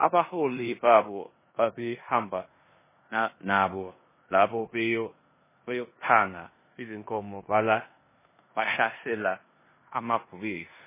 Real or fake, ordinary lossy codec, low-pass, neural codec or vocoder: fake; MP3, 16 kbps; 3.6 kHz; codec, 16 kHz in and 24 kHz out, 0.9 kbps, LongCat-Audio-Codec, four codebook decoder